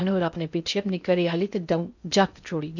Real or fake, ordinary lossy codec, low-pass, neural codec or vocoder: fake; none; 7.2 kHz; codec, 16 kHz in and 24 kHz out, 0.6 kbps, FocalCodec, streaming, 2048 codes